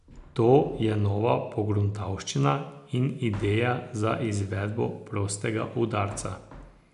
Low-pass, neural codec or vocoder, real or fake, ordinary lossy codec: 10.8 kHz; none; real; none